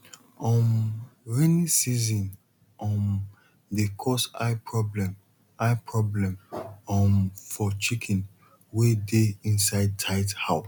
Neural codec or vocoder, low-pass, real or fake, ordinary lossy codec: none; 19.8 kHz; real; none